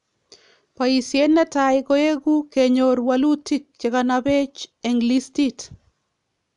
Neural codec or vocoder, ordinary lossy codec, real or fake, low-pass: none; none; real; 10.8 kHz